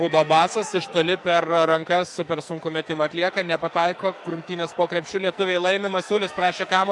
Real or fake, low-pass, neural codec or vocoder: fake; 10.8 kHz; codec, 44.1 kHz, 2.6 kbps, SNAC